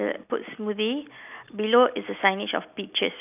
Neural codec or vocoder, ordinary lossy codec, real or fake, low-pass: none; none; real; 3.6 kHz